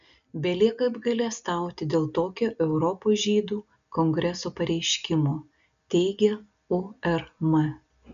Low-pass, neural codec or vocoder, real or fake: 7.2 kHz; none; real